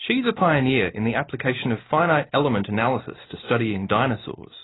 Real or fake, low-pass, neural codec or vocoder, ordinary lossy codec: real; 7.2 kHz; none; AAC, 16 kbps